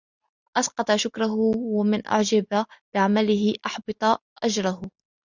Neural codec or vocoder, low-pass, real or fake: none; 7.2 kHz; real